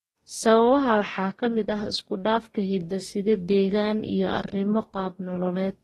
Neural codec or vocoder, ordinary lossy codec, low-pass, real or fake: codec, 44.1 kHz, 2.6 kbps, DAC; AAC, 32 kbps; 19.8 kHz; fake